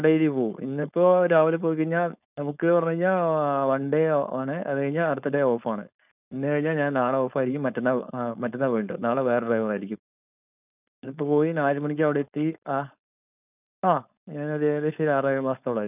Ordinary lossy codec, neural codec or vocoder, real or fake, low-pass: none; codec, 16 kHz, 4.8 kbps, FACodec; fake; 3.6 kHz